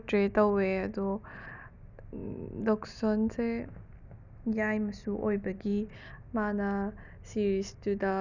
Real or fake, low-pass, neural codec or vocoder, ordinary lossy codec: real; 7.2 kHz; none; none